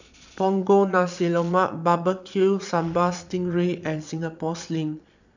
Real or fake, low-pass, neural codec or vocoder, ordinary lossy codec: fake; 7.2 kHz; vocoder, 22.05 kHz, 80 mel bands, Vocos; none